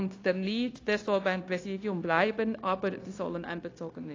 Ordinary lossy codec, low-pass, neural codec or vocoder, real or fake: AAC, 32 kbps; 7.2 kHz; codec, 16 kHz, 0.9 kbps, LongCat-Audio-Codec; fake